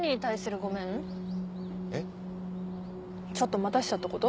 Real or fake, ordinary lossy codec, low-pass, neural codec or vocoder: real; none; none; none